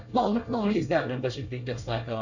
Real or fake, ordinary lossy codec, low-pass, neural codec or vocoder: fake; none; 7.2 kHz; codec, 24 kHz, 1 kbps, SNAC